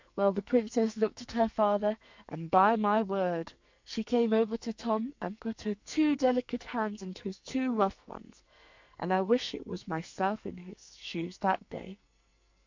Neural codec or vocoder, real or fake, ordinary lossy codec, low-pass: codec, 44.1 kHz, 2.6 kbps, SNAC; fake; MP3, 64 kbps; 7.2 kHz